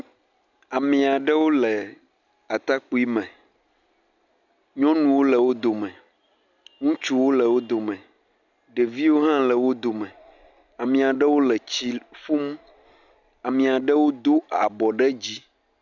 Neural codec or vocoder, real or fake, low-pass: none; real; 7.2 kHz